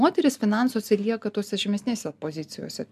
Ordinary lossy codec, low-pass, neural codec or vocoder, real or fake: MP3, 96 kbps; 14.4 kHz; none; real